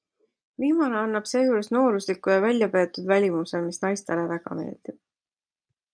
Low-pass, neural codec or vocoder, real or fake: 9.9 kHz; none; real